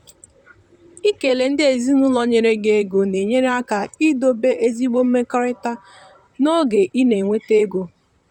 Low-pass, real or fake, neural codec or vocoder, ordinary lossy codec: 19.8 kHz; fake; vocoder, 44.1 kHz, 128 mel bands, Pupu-Vocoder; none